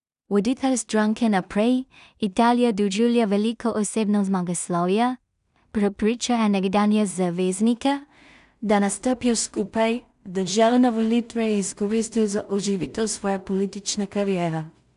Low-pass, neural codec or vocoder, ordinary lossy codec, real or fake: 10.8 kHz; codec, 16 kHz in and 24 kHz out, 0.4 kbps, LongCat-Audio-Codec, two codebook decoder; none; fake